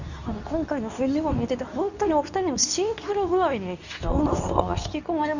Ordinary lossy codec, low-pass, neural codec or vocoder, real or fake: none; 7.2 kHz; codec, 24 kHz, 0.9 kbps, WavTokenizer, medium speech release version 2; fake